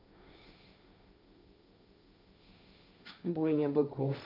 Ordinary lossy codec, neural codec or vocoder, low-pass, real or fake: none; codec, 16 kHz, 1.1 kbps, Voila-Tokenizer; 5.4 kHz; fake